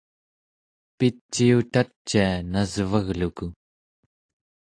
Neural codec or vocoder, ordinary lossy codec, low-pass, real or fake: none; AAC, 48 kbps; 9.9 kHz; real